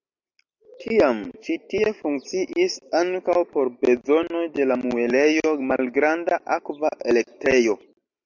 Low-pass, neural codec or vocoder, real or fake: 7.2 kHz; none; real